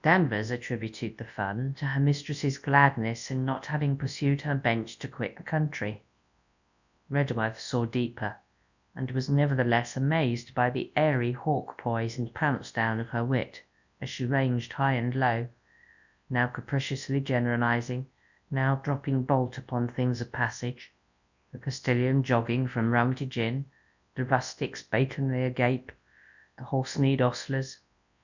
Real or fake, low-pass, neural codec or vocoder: fake; 7.2 kHz; codec, 24 kHz, 0.9 kbps, WavTokenizer, large speech release